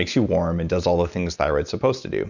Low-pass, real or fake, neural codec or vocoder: 7.2 kHz; real; none